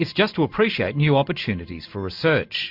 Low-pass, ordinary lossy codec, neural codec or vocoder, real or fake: 5.4 kHz; MP3, 48 kbps; none; real